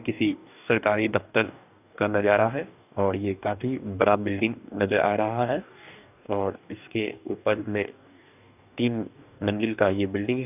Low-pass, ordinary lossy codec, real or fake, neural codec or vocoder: 3.6 kHz; none; fake; codec, 44.1 kHz, 2.6 kbps, DAC